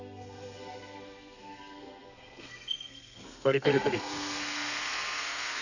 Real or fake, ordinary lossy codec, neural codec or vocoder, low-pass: fake; none; codec, 32 kHz, 1.9 kbps, SNAC; 7.2 kHz